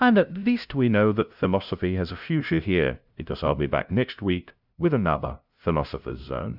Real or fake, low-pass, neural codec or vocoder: fake; 5.4 kHz; codec, 16 kHz, 0.5 kbps, FunCodec, trained on LibriTTS, 25 frames a second